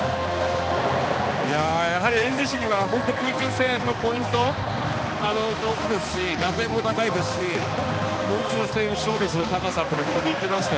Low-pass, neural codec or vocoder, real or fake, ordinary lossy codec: none; codec, 16 kHz, 2 kbps, X-Codec, HuBERT features, trained on balanced general audio; fake; none